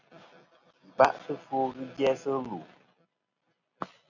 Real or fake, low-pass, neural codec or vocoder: real; 7.2 kHz; none